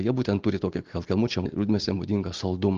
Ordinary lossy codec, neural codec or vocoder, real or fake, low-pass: Opus, 24 kbps; none; real; 7.2 kHz